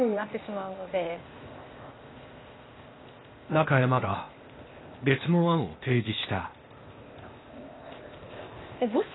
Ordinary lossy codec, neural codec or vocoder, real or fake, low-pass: AAC, 16 kbps; codec, 16 kHz, 0.8 kbps, ZipCodec; fake; 7.2 kHz